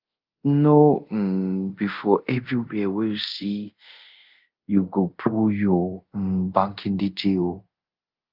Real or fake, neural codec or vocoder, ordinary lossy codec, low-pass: fake; codec, 24 kHz, 0.5 kbps, DualCodec; Opus, 24 kbps; 5.4 kHz